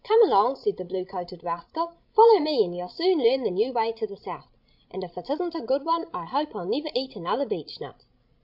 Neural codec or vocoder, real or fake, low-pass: codec, 16 kHz, 16 kbps, FreqCodec, larger model; fake; 5.4 kHz